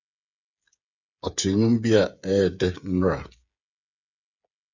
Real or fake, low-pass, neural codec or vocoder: fake; 7.2 kHz; codec, 16 kHz, 8 kbps, FreqCodec, smaller model